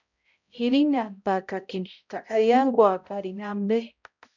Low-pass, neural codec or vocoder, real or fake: 7.2 kHz; codec, 16 kHz, 0.5 kbps, X-Codec, HuBERT features, trained on balanced general audio; fake